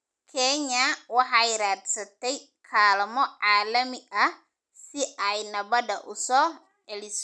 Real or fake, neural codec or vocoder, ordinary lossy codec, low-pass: real; none; none; none